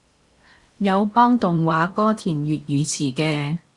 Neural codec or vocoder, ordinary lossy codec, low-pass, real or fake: codec, 16 kHz in and 24 kHz out, 0.8 kbps, FocalCodec, streaming, 65536 codes; Opus, 64 kbps; 10.8 kHz; fake